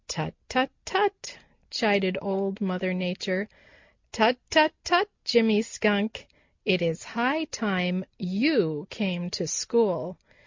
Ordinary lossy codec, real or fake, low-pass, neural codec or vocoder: MP3, 64 kbps; real; 7.2 kHz; none